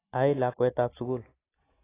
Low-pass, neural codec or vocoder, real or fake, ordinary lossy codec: 3.6 kHz; none; real; AAC, 16 kbps